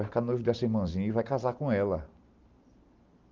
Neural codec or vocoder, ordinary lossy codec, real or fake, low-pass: none; Opus, 32 kbps; real; 7.2 kHz